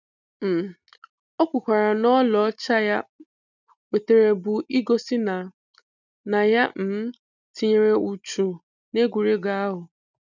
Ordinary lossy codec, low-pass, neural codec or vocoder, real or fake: none; 7.2 kHz; none; real